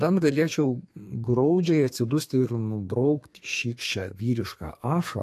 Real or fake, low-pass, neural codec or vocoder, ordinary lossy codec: fake; 14.4 kHz; codec, 32 kHz, 1.9 kbps, SNAC; AAC, 64 kbps